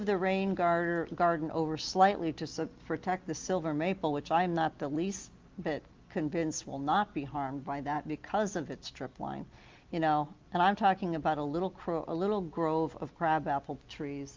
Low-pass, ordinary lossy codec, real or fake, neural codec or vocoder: 7.2 kHz; Opus, 24 kbps; real; none